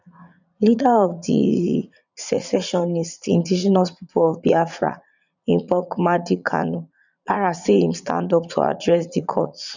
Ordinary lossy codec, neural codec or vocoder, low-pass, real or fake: none; vocoder, 44.1 kHz, 80 mel bands, Vocos; 7.2 kHz; fake